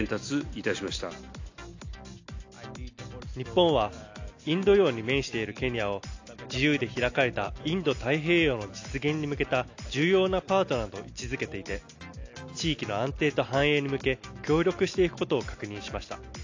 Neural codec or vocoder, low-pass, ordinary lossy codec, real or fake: none; 7.2 kHz; AAC, 48 kbps; real